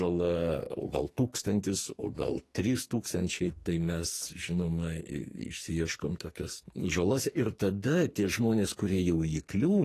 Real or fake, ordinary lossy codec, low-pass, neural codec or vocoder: fake; AAC, 48 kbps; 14.4 kHz; codec, 44.1 kHz, 2.6 kbps, SNAC